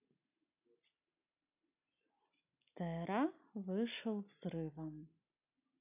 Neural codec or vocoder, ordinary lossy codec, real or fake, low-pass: none; none; real; 3.6 kHz